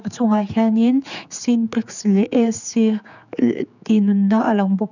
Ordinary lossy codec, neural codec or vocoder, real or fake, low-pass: none; codec, 16 kHz, 4 kbps, X-Codec, HuBERT features, trained on general audio; fake; 7.2 kHz